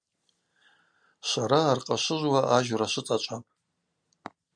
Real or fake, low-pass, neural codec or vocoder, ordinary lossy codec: real; 9.9 kHz; none; MP3, 96 kbps